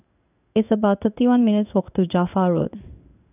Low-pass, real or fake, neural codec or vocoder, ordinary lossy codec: 3.6 kHz; fake; codec, 16 kHz in and 24 kHz out, 1 kbps, XY-Tokenizer; none